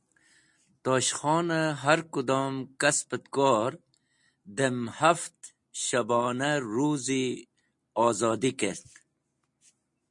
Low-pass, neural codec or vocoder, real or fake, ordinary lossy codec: 10.8 kHz; none; real; MP3, 48 kbps